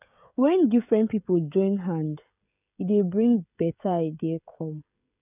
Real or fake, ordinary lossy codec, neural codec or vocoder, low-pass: fake; none; codec, 16 kHz, 16 kbps, FreqCodec, smaller model; 3.6 kHz